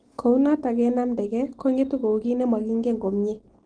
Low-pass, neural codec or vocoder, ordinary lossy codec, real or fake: 9.9 kHz; none; Opus, 16 kbps; real